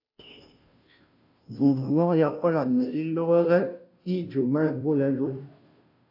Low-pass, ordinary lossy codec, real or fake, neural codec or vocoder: 5.4 kHz; AAC, 48 kbps; fake; codec, 16 kHz, 0.5 kbps, FunCodec, trained on Chinese and English, 25 frames a second